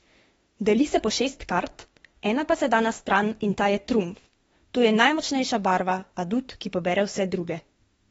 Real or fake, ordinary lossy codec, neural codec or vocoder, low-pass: fake; AAC, 24 kbps; autoencoder, 48 kHz, 32 numbers a frame, DAC-VAE, trained on Japanese speech; 19.8 kHz